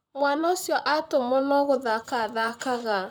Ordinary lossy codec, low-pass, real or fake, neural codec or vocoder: none; none; fake; vocoder, 44.1 kHz, 128 mel bands, Pupu-Vocoder